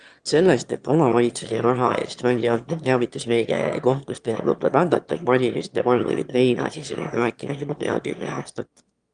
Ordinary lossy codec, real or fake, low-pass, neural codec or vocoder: Opus, 32 kbps; fake; 9.9 kHz; autoencoder, 22.05 kHz, a latent of 192 numbers a frame, VITS, trained on one speaker